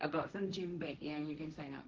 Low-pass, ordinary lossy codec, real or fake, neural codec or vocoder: 7.2 kHz; Opus, 16 kbps; fake; codec, 16 kHz, 1.1 kbps, Voila-Tokenizer